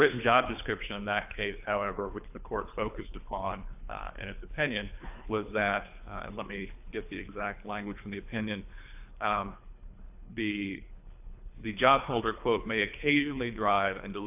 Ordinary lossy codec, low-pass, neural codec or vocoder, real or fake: AAC, 32 kbps; 3.6 kHz; codec, 24 kHz, 3 kbps, HILCodec; fake